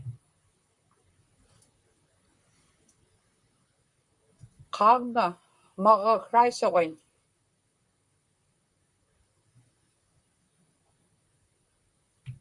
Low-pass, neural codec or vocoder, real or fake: 10.8 kHz; vocoder, 44.1 kHz, 128 mel bands, Pupu-Vocoder; fake